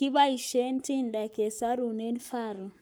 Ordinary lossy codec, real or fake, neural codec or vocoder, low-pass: none; fake; codec, 44.1 kHz, 7.8 kbps, Pupu-Codec; none